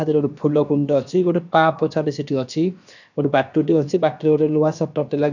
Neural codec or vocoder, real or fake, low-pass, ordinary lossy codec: codec, 16 kHz, about 1 kbps, DyCAST, with the encoder's durations; fake; 7.2 kHz; none